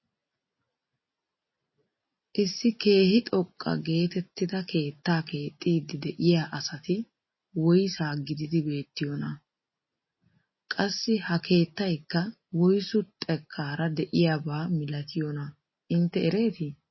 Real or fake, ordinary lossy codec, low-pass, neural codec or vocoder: real; MP3, 24 kbps; 7.2 kHz; none